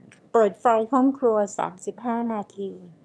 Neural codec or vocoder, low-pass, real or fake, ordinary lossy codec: autoencoder, 22.05 kHz, a latent of 192 numbers a frame, VITS, trained on one speaker; none; fake; none